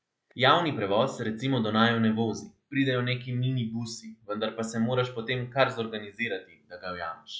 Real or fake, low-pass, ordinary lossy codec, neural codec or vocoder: real; none; none; none